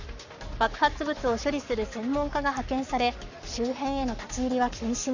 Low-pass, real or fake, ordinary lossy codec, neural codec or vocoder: 7.2 kHz; fake; none; codec, 44.1 kHz, 7.8 kbps, Pupu-Codec